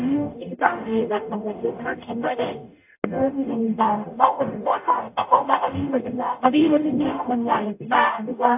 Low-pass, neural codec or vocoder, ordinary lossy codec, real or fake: 3.6 kHz; codec, 44.1 kHz, 0.9 kbps, DAC; AAC, 32 kbps; fake